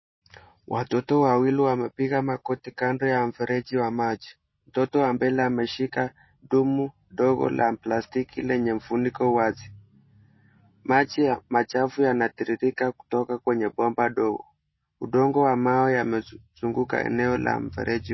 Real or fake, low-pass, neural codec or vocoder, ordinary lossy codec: real; 7.2 kHz; none; MP3, 24 kbps